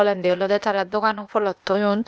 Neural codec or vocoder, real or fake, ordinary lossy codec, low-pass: codec, 16 kHz, 0.8 kbps, ZipCodec; fake; none; none